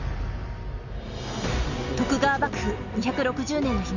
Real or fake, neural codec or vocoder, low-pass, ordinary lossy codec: real; none; 7.2 kHz; none